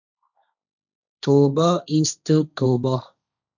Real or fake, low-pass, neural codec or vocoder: fake; 7.2 kHz; codec, 16 kHz, 1.1 kbps, Voila-Tokenizer